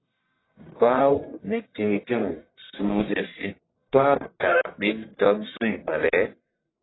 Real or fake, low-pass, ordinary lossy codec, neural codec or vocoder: fake; 7.2 kHz; AAC, 16 kbps; codec, 44.1 kHz, 1.7 kbps, Pupu-Codec